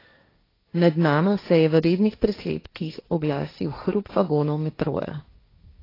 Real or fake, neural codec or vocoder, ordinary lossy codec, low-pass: fake; codec, 16 kHz, 1.1 kbps, Voila-Tokenizer; AAC, 24 kbps; 5.4 kHz